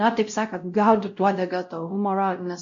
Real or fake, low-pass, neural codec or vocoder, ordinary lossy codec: fake; 7.2 kHz; codec, 16 kHz, 0.5 kbps, X-Codec, WavLM features, trained on Multilingual LibriSpeech; MP3, 48 kbps